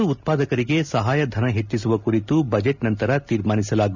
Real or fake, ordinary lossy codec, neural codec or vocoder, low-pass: real; none; none; 7.2 kHz